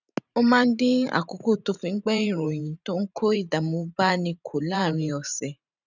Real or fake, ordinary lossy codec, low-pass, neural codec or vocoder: fake; none; 7.2 kHz; vocoder, 44.1 kHz, 128 mel bands every 512 samples, BigVGAN v2